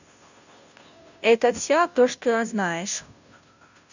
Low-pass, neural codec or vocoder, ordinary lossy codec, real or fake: 7.2 kHz; codec, 16 kHz, 0.5 kbps, FunCodec, trained on Chinese and English, 25 frames a second; none; fake